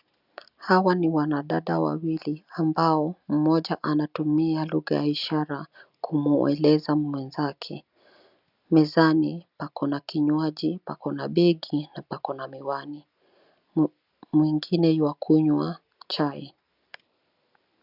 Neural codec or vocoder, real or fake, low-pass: none; real; 5.4 kHz